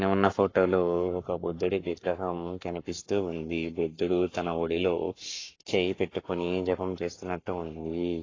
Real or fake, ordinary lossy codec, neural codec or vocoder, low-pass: fake; AAC, 32 kbps; codec, 44.1 kHz, 7.8 kbps, Pupu-Codec; 7.2 kHz